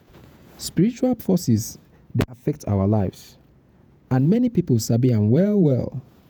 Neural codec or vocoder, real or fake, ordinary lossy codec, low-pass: vocoder, 48 kHz, 128 mel bands, Vocos; fake; none; none